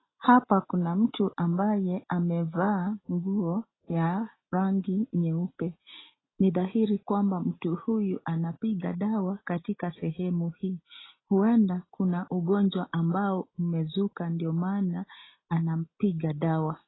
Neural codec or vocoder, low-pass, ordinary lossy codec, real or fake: none; 7.2 kHz; AAC, 16 kbps; real